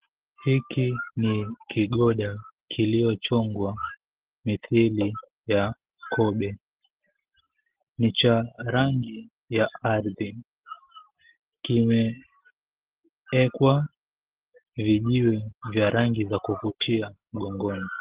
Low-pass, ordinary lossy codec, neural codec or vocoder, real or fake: 3.6 kHz; Opus, 16 kbps; none; real